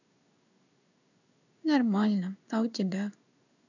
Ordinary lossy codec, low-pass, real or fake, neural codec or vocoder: none; 7.2 kHz; fake; codec, 16 kHz in and 24 kHz out, 1 kbps, XY-Tokenizer